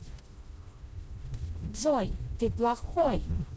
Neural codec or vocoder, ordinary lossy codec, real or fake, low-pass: codec, 16 kHz, 1 kbps, FreqCodec, smaller model; none; fake; none